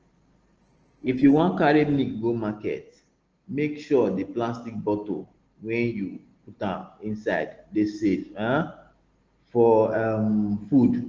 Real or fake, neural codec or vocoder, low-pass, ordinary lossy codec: real; none; 7.2 kHz; Opus, 16 kbps